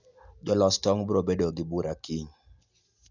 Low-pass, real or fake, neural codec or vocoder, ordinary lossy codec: 7.2 kHz; real; none; none